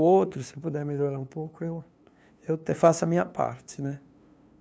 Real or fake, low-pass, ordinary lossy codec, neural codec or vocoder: fake; none; none; codec, 16 kHz, 2 kbps, FunCodec, trained on LibriTTS, 25 frames a second